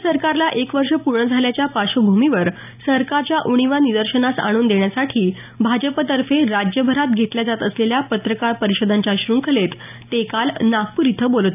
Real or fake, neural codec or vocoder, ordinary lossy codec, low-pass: real; none; none; 3.6 kHz